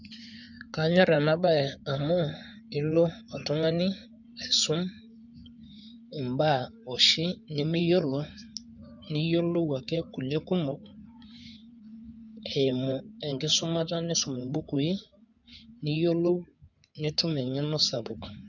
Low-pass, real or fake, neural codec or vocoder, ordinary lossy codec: 7.2 kHz; fake; codec, 16 kHz in and 24 kHz out, 2.2 kbps, FireRedTTS-2 codec; none